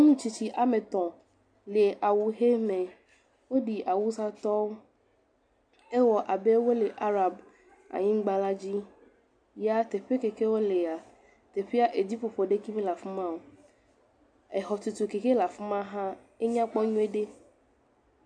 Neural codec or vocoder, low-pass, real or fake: none; 9.9 kHz; real